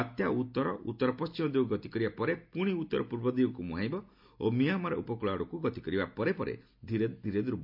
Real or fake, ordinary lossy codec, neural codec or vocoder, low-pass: real; MP3, 48 kbps; none; 5.4 kHz